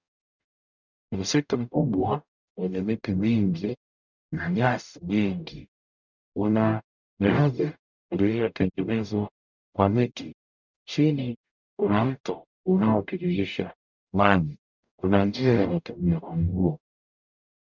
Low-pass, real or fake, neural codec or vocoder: 7.2 kHz; fake; codec, 44.1 kHz, 0.9 kbps, DAC